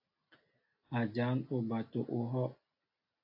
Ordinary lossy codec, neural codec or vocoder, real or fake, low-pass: AAC, 32 kbps; none; real; 5.4 kHz